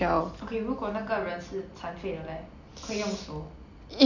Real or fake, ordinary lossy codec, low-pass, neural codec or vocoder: real; none; 7.2 kHz; none